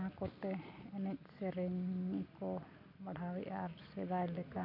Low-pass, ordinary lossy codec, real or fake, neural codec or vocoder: 5.4 kHz; none; real; none